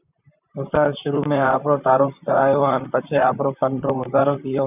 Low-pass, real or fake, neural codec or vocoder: 3.6 kHz; fake; vocoder, 44.1 kHz, 128 mel bands, Pupu-Vocoder